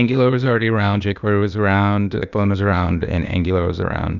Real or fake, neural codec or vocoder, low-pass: fake; codec, 16 kHz, 0.8 kbps, ZipCodec; 7.2 kHz